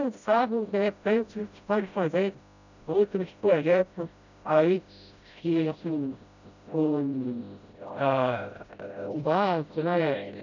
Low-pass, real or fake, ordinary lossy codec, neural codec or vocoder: 7.2 kHz; fake; none; codec, 16 kHz, 0.5 kbps, FreqCodec, smaller model